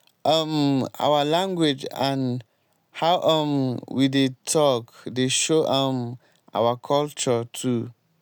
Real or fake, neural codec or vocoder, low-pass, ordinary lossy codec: real; none; none; none